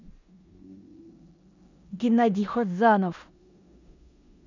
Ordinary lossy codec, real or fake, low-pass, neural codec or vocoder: none; fake; 7.2 kHz; codec, 16 kHz in and 24 kHz out, 0.9 kbps, LongCat-Audio-Codec, four codebook decoder